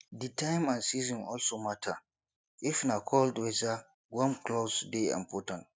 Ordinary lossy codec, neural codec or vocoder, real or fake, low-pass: none; none; real; none